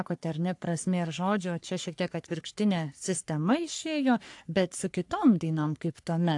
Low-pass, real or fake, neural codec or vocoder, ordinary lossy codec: 10.8 kHz; fake; codec, 44.1 kHz, 3.4 kbps, Pupu-Codec; AAC, 64 kbps